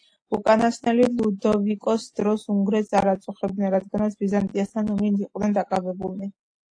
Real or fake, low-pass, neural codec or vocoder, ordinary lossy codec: real; 9.9 kHz; none; AAC, 48 kbps